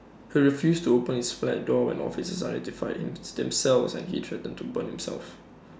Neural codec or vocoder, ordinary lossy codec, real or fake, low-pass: none; none; real; none